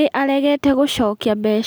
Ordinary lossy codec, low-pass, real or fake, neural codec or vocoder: none; none; real; none